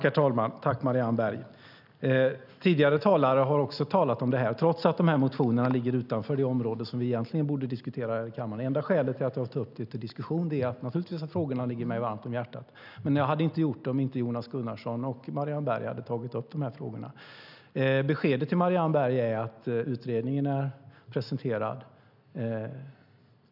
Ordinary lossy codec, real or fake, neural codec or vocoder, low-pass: none; real; none; 5.4 kHz